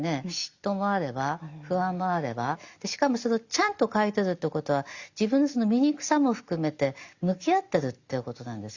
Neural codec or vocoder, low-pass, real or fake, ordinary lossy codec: none; 7.2 kHz; real; Opus, 64 kbps